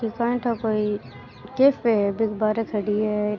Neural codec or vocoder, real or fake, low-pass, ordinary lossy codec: none; real; 7.2 kHz; Opus, 64 kbps